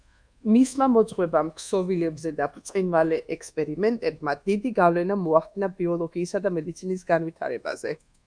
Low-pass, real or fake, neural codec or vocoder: 9.9 kHz; fake; codec, 24 kHz, 1.2 kbps, DualCodec